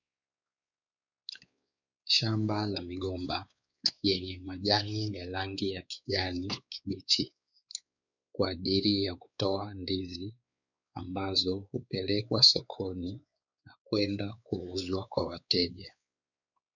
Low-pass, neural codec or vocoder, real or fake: 7.2 kHz; codec, 16 kHz, 4 kbps, X-Codec, WavLM features, trained on Multilingual LibriSpeech; fake